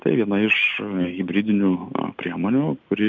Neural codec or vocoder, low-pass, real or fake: none; 7.2 kHz; real